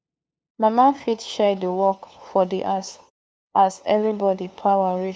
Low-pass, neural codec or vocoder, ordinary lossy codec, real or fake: none; codec, 16 kHz, 2 kbps, FunCodec, trained on LibriTTS, 25 frames a second; none; fake